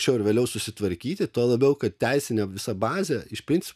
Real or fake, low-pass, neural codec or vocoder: real; 14.4 kHz; none